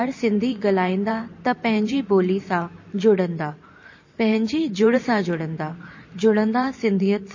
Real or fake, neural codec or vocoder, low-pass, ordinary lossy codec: fake; vocoder, 44.1 kHz, 128 mel bands every 512 samples, BigVGAN v2; 7.2 kHz; MP3, 32 kbps